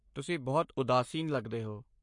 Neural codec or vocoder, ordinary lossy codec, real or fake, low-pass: codec, 44.1 kHz, 7.8 kbps, Pupu-Codec; MP3, 48 kbps; fake; 10.8 kHz